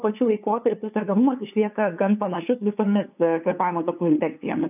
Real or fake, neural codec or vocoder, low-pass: fake; codec, 16 kHz, 2 kbps, FunCodec, trained on LibriTTS, 25 frames a second; 3.6 kHz